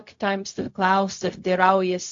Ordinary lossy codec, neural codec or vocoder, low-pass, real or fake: AAC, 48 kbps; codec, 16 kHz, 0.4 kbps, LongCat-Audio-Codec; 7.2 kHz; fake